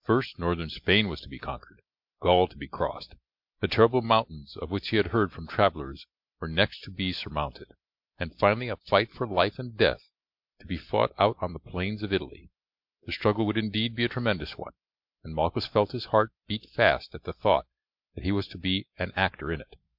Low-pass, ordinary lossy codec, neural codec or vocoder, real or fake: 5.4 kHz; MP3, 48 kbps; none; real